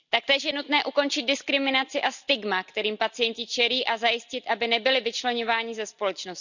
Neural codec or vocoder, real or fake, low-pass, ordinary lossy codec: none; real; 7.2 kHz; none